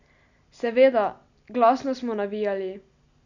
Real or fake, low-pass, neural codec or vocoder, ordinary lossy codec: real; 7.2 kHz; none; none